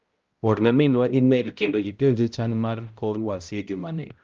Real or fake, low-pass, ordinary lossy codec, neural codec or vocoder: fake; 7.2 kHz; Opus, 32 kbps; codec, 16 kHz, 0.5 kbps, X-Codec, HuBERT features, trained on balanced general audio